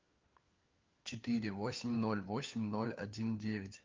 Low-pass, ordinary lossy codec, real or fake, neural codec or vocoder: 7.2 kHz; Opus, 32 kbps; fake; codec, 16 kHz, 4 kbps, FunCodec, trained on LibriTTS, 50 frames a second